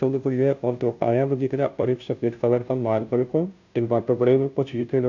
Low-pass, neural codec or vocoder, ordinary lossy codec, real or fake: 7.2 kHz; codec, 16 kHz, 0.5 kbps, FunCodec, trained on Chinese and English, 25 frames a second; none; fake